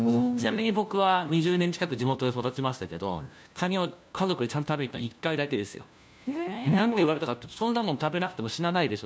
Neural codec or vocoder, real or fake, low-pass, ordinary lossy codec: codec, 16 kHz, 1 kbps, FunCodec, trained on LibriTTS, 50 frames a second; fake; none; none